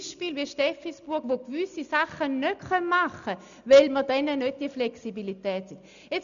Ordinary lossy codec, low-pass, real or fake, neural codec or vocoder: none; 7.2 kHz; real; none